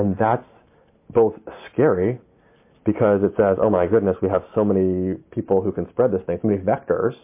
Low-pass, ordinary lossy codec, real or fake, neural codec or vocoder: 3.6 kHz; MP3, 24 kbps; real; none